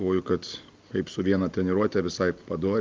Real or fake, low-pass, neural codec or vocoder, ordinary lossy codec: real; 7.2 kHz; none; Opus, 32 kbps